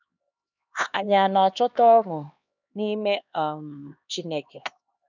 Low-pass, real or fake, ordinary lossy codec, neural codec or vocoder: 7.2 kHz; fake; none; codec, 16 kHz, 2 kbps, X-Codec, HuBERT features, trained on LibriSpeech